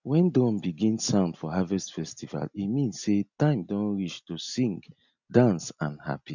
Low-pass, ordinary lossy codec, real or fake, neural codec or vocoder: 7.2 kHz; none; real; none